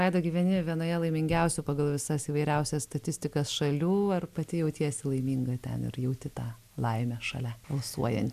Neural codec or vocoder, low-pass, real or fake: none; 14.4 kHz; real